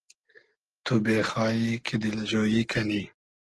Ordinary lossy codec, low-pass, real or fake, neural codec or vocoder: Opus, 16 kbps; 10.8 kHz; real; none